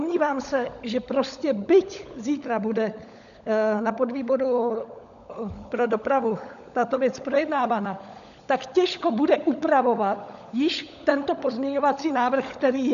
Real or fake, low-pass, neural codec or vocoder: fake; 7.2 kHz; codec, 16 kHz, 16 kbps, FunCodec, trained on LibriTTS, 50 frames a second